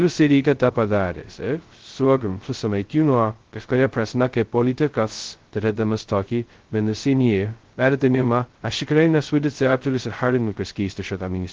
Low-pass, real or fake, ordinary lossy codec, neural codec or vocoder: 7.2 kHz; fake; Opus, 16 kbps; codec, 16 kHz, 0.2 kbps, FocalCodec